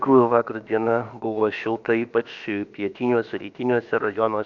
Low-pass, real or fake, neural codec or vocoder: 7.2 kHz; fake; codec, 16 kHz, about 1 kbps, DyCAST, with the encoder's durations